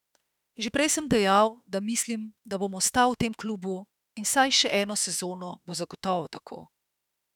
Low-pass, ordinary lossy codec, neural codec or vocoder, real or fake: 19.8 kHz; none; autoencoder, 48 kHz, 32 numbers a frame, DAC-VAE, trained on Japanese speech; fake